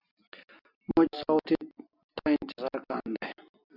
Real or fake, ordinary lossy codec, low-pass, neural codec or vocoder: real; AAC, 48 kbps; 5.4 kHz; none